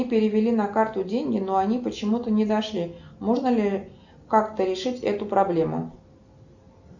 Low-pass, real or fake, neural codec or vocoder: 7.2 kHz; real; none